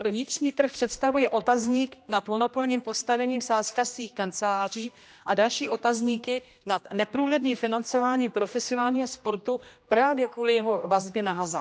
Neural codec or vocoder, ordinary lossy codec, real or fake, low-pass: codec, 16 kHz, 1 kbps, X-Codec, HuBERT features, trained on general audio; none; fake; none